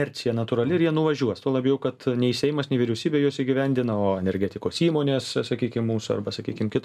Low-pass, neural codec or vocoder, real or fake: 14.4 kHz; none; real